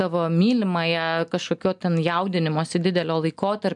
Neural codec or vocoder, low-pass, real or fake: none; 10.8 kHz; real